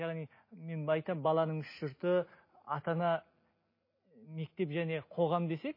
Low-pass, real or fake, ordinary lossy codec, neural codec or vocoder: 5.4 kHz; real; MP3, 24 kbps; none